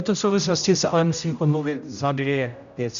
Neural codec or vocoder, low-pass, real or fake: codec, 16 kHz, 0.5 kbps, X-Codec, HuBERT features, trained on general audio; 7.2 kHz; fake